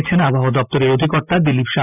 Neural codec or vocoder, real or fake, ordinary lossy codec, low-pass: none; real; none; 3.6 kHz